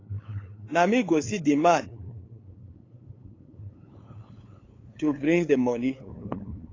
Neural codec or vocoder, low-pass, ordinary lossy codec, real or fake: codec, 16 kHz, 8 kbps, FunCodec, trained on LibriTTS, 25 frames a second; 7.2 kHz; AAC, 32 kbps; fake